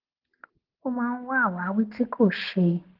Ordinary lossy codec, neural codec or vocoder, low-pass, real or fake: Opus, 16 kbps; none; 5.4 kHz; real